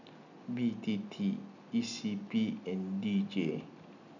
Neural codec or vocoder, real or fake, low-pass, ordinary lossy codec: none; real; 7.2 kHz; none